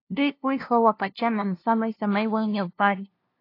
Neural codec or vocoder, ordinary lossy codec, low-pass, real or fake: codec, 16 kHz, 0.5 kbps, FunCodec, trained on LibriTTS, 25 frames a second; AAC, 32 kbps; 5.4 kHz; fake